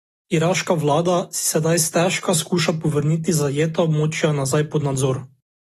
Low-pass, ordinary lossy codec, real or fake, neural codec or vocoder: 19.8 kHz; AAC, 32 kbps; real; none